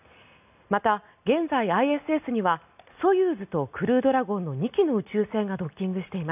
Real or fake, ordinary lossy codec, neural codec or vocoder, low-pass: real; none; none; 3.6 kHz